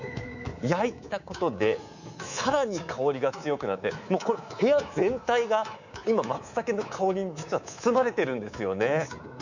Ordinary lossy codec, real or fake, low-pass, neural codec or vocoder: none; fake; 7.2 kHz; codec, 24 kHz, 3.1 kbps, DualCodec